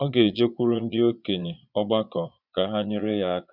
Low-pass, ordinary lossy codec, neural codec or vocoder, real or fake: 5.4 kHz; none; vocoder, 22.05 kHz, 80 mel bands, Vocos; fake